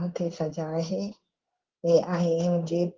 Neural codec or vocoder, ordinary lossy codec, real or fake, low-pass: codec, 16 kHz in and 24 kHz out, 1 kbps, XY-Tokenizer; Opus, 16 kbps; fake; 7.2 kHz